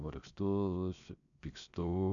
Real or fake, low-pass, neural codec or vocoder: fake; 7.2 kHz; codec, 16 kHz, 0.7 kbps, FocalCodec